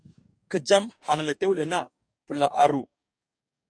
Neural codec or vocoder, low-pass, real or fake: codec, 44.1 kHz, 2.6 kbps, DAC; 9.9 kHz; fake